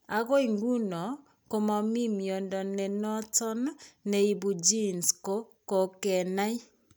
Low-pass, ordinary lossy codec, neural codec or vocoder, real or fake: none; none; none; real